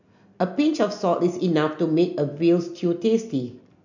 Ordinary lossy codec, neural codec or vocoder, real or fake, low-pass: none; none; real; 7.2 kHz